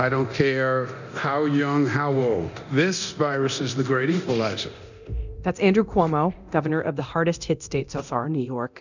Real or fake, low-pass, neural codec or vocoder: fake; 7.2 kHz; codec, 24 kHz, 0.9 kbps, DualCodec